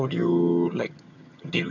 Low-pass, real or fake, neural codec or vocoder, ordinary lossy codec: 7.2 kHz; fake; vocoder, 22.05 kHz, 80 mel bands, HiFi-GAN; none